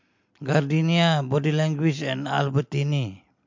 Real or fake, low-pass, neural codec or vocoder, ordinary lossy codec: real; 7.2 kHz; none; MP3, 48 kbps